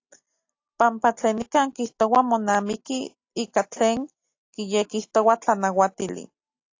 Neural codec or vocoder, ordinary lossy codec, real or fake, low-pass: none; AAC, 48 kbps; real; 7.2 kHz